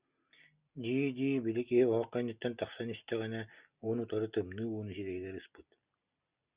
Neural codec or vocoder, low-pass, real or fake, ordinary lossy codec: none; 3.6 kHz; real; Opus, 64 kbps